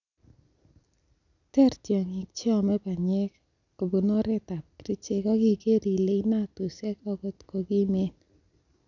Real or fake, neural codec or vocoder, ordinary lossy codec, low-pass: real; none; none; 7.2 kHz